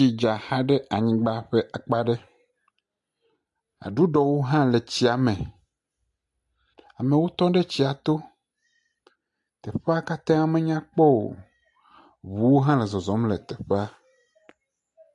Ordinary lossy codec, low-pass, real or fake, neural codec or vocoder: AAC, 64 kbps; 10.8 kHz; real; none